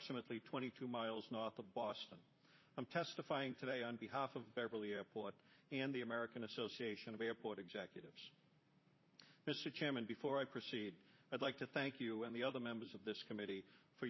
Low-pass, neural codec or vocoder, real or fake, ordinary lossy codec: 7.2 kHz; vocoder, 44.1 kHz, 128 mel bands, Pupu-Vocoder; fake; MP3, 24 kbps